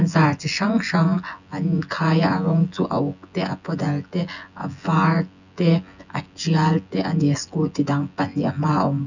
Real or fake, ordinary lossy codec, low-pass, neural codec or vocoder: fake; none; 7.2 kHz; vocoder, 24 kHz, 100 mel bands, Vocos